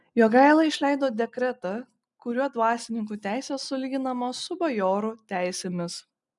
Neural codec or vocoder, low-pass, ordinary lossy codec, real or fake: none; 10.8 kHz; MP3, 96 kbps; real